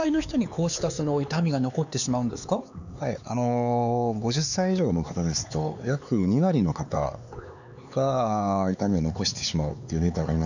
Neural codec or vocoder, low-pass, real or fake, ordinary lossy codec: codec, 16 kHz, 4 kbps, X-Codec, HuBERT features, trained on LibriSpeech; 7.2 kHz; fake; none